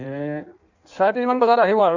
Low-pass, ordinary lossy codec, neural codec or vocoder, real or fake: 7.2 kHz; Opus, 64 kbps; codec, 16 kHz in and 24 kHz out, 1.1 kbps, FireRedTTS-2 codec; fake